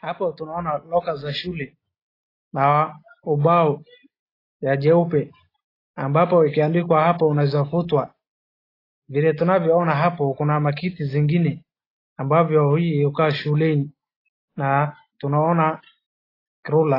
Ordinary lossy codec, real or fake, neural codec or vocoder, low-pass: AAC, 24 kbps; real; none; 5.4 kHz